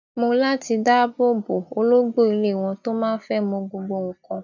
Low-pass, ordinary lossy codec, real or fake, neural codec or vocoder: 7.2 kHz; none; real; none